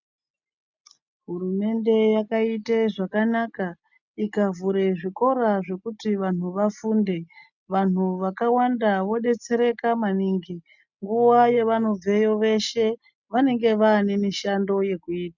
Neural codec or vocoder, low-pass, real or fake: none; 7.2 kHz; real